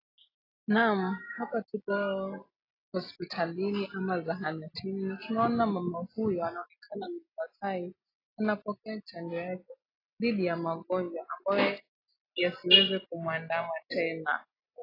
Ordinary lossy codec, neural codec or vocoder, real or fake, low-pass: AAC, 24 kbps; none; real; 5.4 kHz